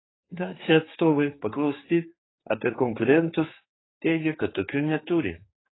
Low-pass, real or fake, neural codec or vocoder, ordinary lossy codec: 7.2 kHz; fake; codec, 16 kHz, 4 kbps, X-Codec, HuBERT features, trained on general audio; AAC, 16 kbps